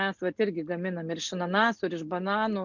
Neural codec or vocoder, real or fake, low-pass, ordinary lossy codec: none; real; 7.2 kHz; MP3, 64 kbps